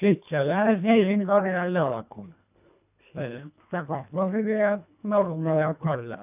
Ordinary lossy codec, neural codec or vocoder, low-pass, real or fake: none; codec, 24 kHz, 1.5 kbps, HILCodec; 3.6 kHz; fake